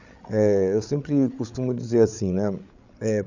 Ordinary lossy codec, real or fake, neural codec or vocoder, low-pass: none; fake; codec, 16 kHz, 8 kbps, FreqCodec, larger model; 7.2 kHz